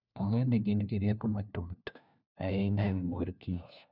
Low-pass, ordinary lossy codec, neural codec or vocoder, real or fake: 5.4 kHz; none; codec, 16 kHz, 1 kbps, FunCodec, trained on LibriTTS, 50 frames a second; fake